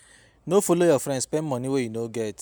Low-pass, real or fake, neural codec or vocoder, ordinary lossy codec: none; real; none; none